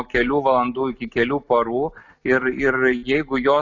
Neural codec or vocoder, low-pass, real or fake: none; 7.2 kHz; real